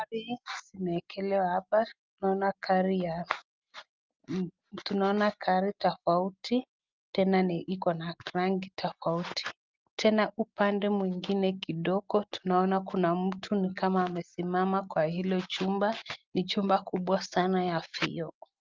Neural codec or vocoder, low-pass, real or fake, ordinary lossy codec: none; 7.2 kHz; real; Opus, 32 kbps